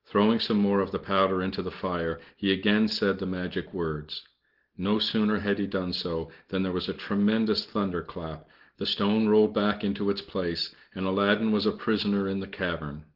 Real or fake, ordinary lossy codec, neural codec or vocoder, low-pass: real; Opus, 16 kbps; none; 5.4 kHz